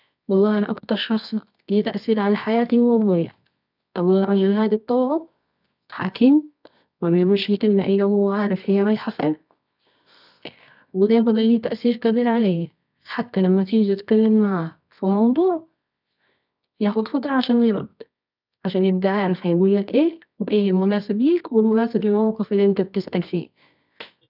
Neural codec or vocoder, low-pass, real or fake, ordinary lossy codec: codec, 24 kHz, 0.9 kbps, WavTokenizer, medium music audio release; 5.4 kHz; fake; none